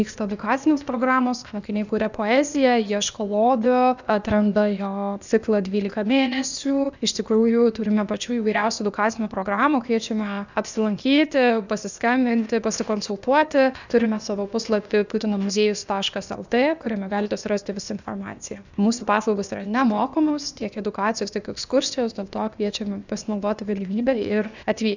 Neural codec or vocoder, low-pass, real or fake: codec, 16 kHz, 0.8 kbps, ZipCodec; 7.2 kHz; fake